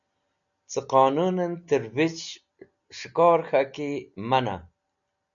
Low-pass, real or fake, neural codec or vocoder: 7.2 kHz; real; none